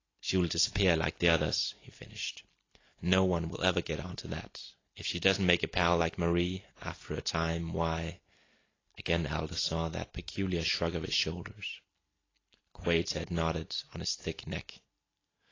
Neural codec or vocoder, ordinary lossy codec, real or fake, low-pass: none; AAC, 32 kbps; real; 7.2 kHz